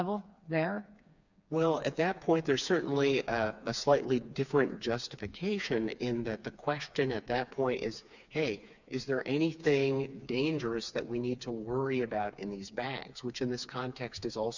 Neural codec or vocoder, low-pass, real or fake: codec, 16 kHz, 4 kbps, FreqCodec, smaller model; 7.2 kHz; fake